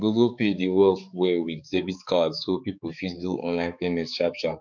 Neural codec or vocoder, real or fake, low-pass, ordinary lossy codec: codec, 16 kHz, 4 kbps, X-Codec, HuBERT features, trained on balanced general audio; fake; 7.2 kHz; none